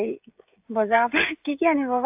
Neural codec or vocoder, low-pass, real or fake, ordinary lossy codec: codec, 16 kHz, 16 kbps, FreqCodec, smaller model; 3.6 kHz; fake; AAC, 32 kbps